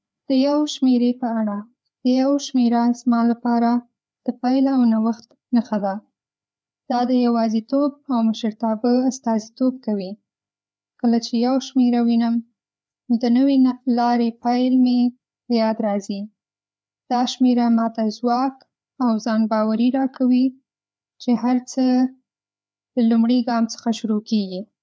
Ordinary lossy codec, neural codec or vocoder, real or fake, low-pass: none; codec, 16 kHz, 4 kbps, FreqCodec, larger model; fake; none